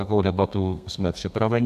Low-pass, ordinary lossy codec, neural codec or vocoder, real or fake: 14.4 kHz; AAC, 96 kbps; codec, 32 kHz, 1.9 kbps, SNAC; fake